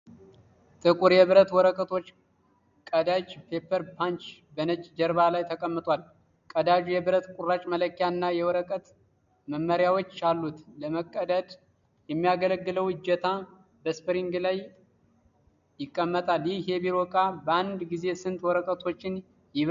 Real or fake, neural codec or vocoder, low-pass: real; none; 7.2 kHz